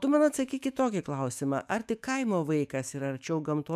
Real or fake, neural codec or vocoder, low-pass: fake; autoencoder, 48 kHz, 128 numbers a frame, DAC-VAE, trained on Japanese speech; 14.4 kHz